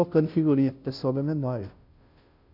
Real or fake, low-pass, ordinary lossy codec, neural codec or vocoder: fake; 5.4 kHz; none; codec, 16 kHz, 0.5 kbps, FunCodec, trained on Chinese and English, 25 frames a second